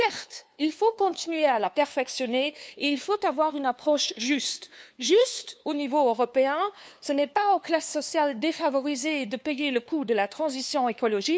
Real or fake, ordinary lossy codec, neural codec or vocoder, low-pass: fake; none; codec, 16 kHz, 2 kbps, FunCodec, trained on LibriTTS, 25 frames a second; none